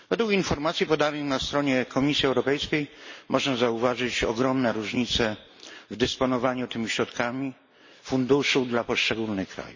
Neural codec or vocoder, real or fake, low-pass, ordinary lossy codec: none; real; 7.2 kHz; MP3, 32 kbps